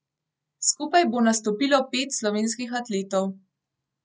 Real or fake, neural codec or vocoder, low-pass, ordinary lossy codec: real; none; none; none